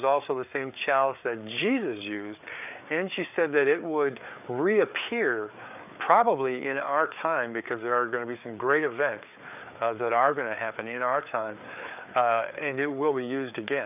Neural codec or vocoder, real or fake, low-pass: codec, 16 kHz, 4 kbps, FreqCodec, larger model; fake; 3.6 kHz